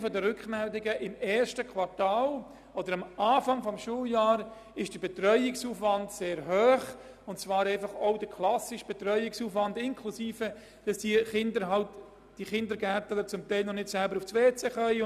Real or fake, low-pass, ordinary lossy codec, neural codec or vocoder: real; 14.4 kHz; none; none